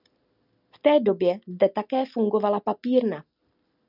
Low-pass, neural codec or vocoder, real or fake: 5.4 kHz; none; real